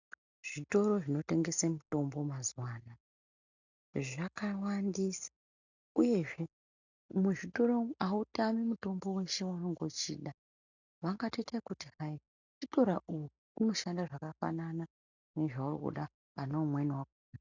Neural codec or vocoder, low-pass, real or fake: none; 7.2 kHz; real